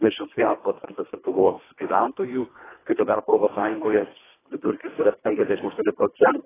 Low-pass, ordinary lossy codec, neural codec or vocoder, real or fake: 3.6 kHz; AAC, 16 kbps; codec, 24 kHz, 1.5 kbps, HILCodec; fake